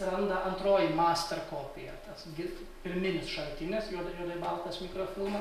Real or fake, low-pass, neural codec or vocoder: real; 14.4 kHz; none